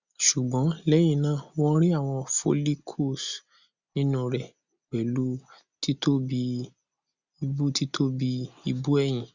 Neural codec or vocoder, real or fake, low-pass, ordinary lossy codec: none; real; 7.2 kHz; Opus, 64 kbps